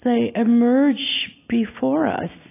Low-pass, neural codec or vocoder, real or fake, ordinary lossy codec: 3.6 kHz; none; real; AAC, 24 kbps